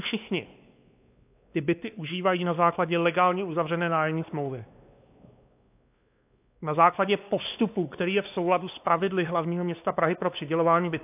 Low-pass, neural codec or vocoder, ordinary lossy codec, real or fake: 3.6 kHz; codec, 16 kHz, 2 kbps, X-Codec, WavLM features, trained on Multilingual LibriSpeech; AAC, 32 kbps; fake